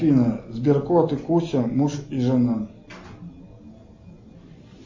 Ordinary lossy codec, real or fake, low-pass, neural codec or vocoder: MP3, 32 kbps; real; 7.2 kHz; none